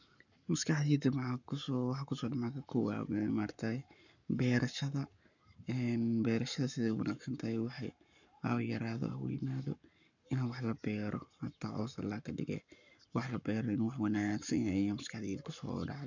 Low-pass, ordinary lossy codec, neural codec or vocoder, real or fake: 7.2 kHz; none; codec, 44.1 kHz, 7.8 kbps, Pupu-Codec; fake